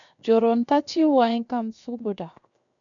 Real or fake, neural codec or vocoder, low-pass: fake; codec, 16 kHz, 0.7 kbps, FocalCodec; 7.2 kHz